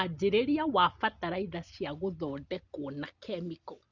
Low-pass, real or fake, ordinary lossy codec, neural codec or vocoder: 7.2 kHz; real; Opus, 64 kbps; none